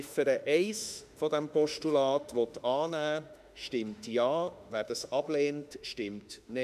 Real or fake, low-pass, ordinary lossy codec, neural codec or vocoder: fake; 14.4 kHz; none; autoencoder, 48 kHz, 32 numbers a frame, DAC-VAE, trained on Japanese speech